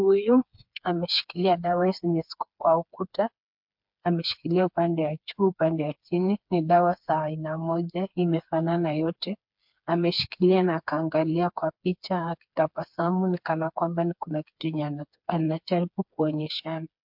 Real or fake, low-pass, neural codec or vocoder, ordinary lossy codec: fake; 5.4 kHz; codec, 16 kHz, 4 kbps, FreqCodec, smaller model; MP3, 48 kbps